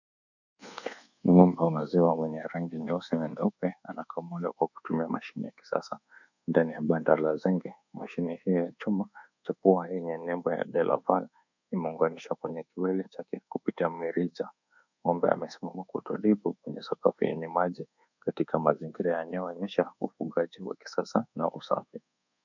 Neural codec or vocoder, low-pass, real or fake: codec, 24 kHz, 1.2 kbps, DualCodec; 7.2 kHz; fake